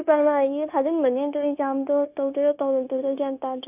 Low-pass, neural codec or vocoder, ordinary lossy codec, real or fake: 3.6 kHz; codec, 16 kHz, 0.9 kbps, LongCat-Audio-Codec; none; fake